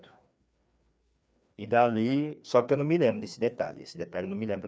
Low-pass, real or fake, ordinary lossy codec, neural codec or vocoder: none; fake; none; codec, 16 kHz, 2 kbps, FreqCodec, larger model